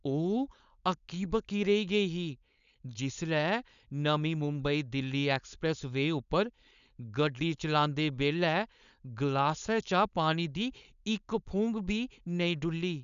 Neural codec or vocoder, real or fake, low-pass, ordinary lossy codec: codec, 16 kHz, 4.8 kbps, FACodec; fake; 7.2 kHz; none